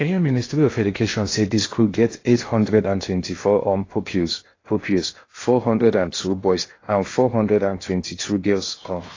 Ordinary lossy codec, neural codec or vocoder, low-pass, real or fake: AAC, 32 kbps; codec, 16 kHz in and 24 kHz out, 0.8 kbps, FocalCodec, streaming, 65536 codes; 7.2 kHz; fake